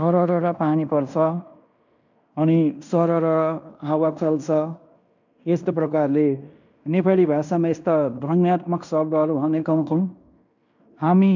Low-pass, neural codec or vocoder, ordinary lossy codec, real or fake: 7.2 kHz; codec, 16 kHz in and 24 kHz out, 0.9 kbps, LongCat-Audio-Codec, fine tuned four codebook decoder; none; fake